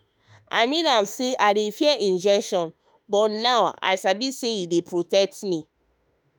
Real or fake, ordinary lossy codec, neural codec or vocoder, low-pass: fake; none; autoencoder, 48 kHz, 32 numbers a frame, DAC-VAE, trained on Japanese speech; none